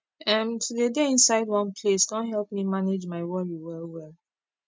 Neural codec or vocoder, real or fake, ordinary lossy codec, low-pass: none; real; none; none